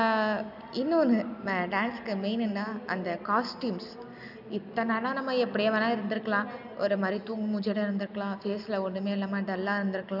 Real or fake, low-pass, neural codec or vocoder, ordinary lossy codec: real; 5.4 kHz; none; none